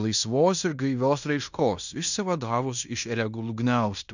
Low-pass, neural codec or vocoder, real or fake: 7.2 kHz; codec, 16 kHz in and 24 kHz out, 0.9 kbps, LongCat-Audio-Codec, fine tuned four codebook decoder; fake